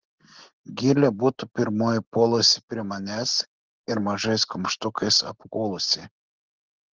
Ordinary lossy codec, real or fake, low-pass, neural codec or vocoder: Opus, 16 kbps; real; 7.2 kHz; none